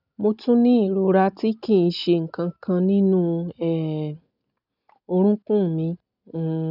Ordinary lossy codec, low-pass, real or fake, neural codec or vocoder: none; 5.4 kHz; real; none